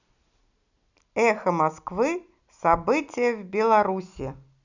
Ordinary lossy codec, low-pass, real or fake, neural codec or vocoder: none; 7.2 kHz; real; none